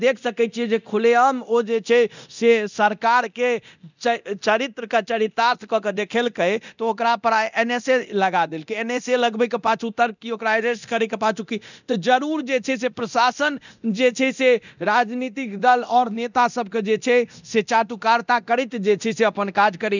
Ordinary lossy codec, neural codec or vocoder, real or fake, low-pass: none; codec, 24 kHz, 0.9 kbps, DualCodec; fake; 7.2 kHz